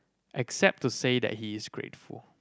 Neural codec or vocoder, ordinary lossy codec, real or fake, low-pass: none; none; real; none